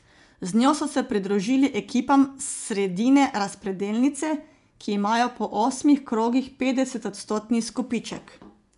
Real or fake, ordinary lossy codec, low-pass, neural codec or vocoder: fake; none; 10.8 kHz; vocoder, 24 kHz, 100 mel bands, Vocos